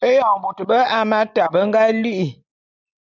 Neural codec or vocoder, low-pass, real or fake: none; 7.2 kHz; real